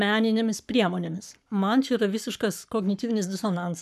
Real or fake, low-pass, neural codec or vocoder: fake; 14.4 kHz; codec, 44.1 kHz, 7.8 kbps, Pupu-Codec